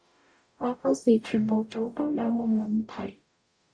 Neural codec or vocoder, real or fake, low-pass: codec, 44.1 kHz, 0.9 kbps, DAC; fake; 9.9 kHz